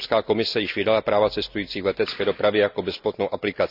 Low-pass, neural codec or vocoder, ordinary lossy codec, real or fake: 5.4 kHz; none; none; real